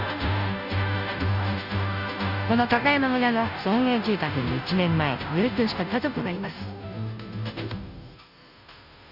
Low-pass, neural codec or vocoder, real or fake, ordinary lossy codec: 5.4 kHz; codec, 16 kHz, 0.5 kbps, FunCodec, trained on Chinese and English, 25 frames a second; fake; none